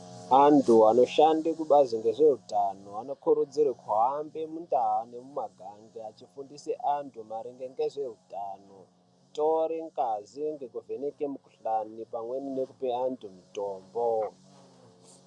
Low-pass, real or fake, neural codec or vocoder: 10.8 kHz; real; none